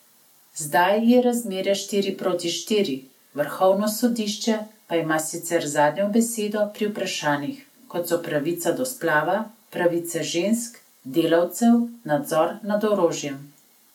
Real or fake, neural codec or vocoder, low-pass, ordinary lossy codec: real; none; 19.8 kHz; MP3, 96 kbps